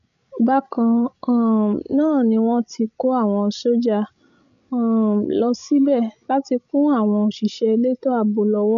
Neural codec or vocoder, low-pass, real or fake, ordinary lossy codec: codec, 16 kHz, 16 kbps, FreqCodec, larger model; 7.2 kHz; fake; none